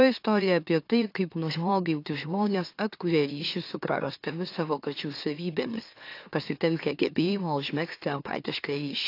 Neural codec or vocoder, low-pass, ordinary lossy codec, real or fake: autoencoder, 44.1 kHz, a latent of 192 numbers a frame, MeloTTS; 5.4 kHz; AAC, 32 kbps; fake